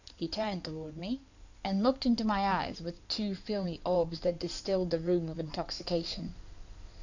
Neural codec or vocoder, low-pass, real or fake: codec, 16 kHz in and 24 kHz out, 2.2 kbps, FireRedTTS-2 codec; 7.2 kHz; fake